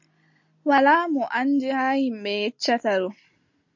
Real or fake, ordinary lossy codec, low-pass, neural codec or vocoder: real; MP3, 48 kbps; 7.2 kHz; none